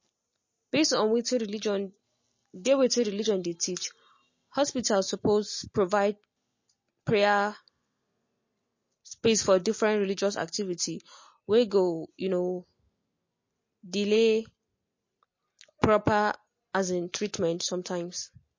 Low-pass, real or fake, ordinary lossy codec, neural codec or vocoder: 7.2 kHz; real; MP3, 32 kbps; none